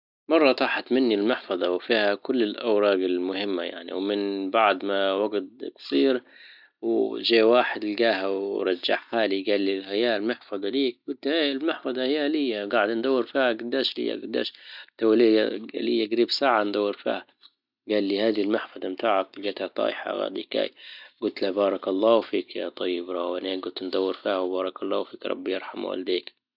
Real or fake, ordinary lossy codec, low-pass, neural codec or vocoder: real; none; 5.4 kHz; none